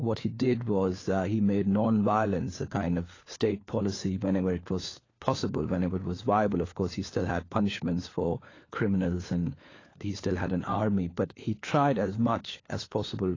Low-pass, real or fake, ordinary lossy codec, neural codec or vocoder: 7.2 kHz; fake; AAC, 32 kbps; codec, 16 kHz, 4 kbps, FunCodec, trained on LibriTTS, 50 frames a second